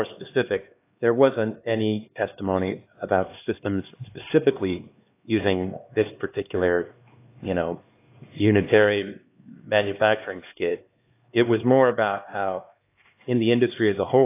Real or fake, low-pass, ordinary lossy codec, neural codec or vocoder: fake; 3.6 kHz; AAC, 24 kbps; codec, 16 kHz, 2 kbps, X-Codec, HuBERT features, trained on LibriSpeech